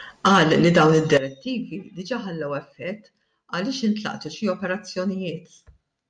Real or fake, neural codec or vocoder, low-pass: real; none; 9.9 kHz